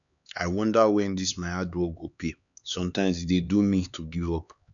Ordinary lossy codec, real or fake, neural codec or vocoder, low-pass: none; fake; codec, 16 kHz, 4 kbps, X-Codec, HuBERT features, trained on LibriSpeech; 7.2 kHz